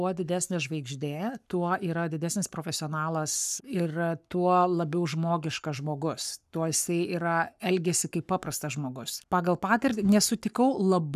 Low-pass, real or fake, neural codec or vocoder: 14.4 kHz; fake; codec, 44.1 kHz, 7.8 kbps, Pupu-Codec